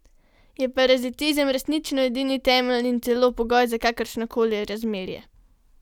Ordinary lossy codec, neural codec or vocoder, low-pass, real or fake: none; none; 19.8 kHz; real